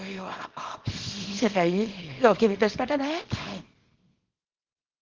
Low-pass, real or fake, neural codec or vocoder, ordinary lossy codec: 7.2 kHz; fake; codec, 24 kHz, 0.9 kbps, WavTokenizer, small release; Opus, 16 kbps